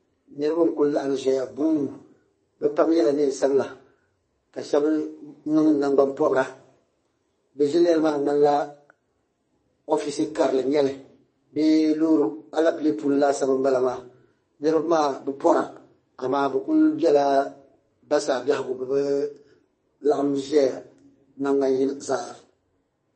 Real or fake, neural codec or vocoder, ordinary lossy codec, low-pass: fake; codec, 44.1 kHz, 2.6 kbps, SNAC; MP3, 32 kbps; 10.8 kHz